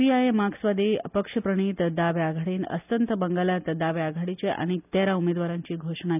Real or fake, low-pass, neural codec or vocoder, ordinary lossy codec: real; 3.6 kHz; none; none